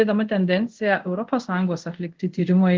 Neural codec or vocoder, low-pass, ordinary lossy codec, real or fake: codec, 24 kHz, 0.5 kbps, DualCodec; 7.2 kHz; Opus, 16 kbps; fake